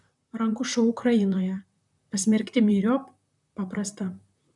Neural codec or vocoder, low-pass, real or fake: vocoder, 44.1 kHz, 128 mel bands, Pupu-Vocoder; 10.8 kHz; fake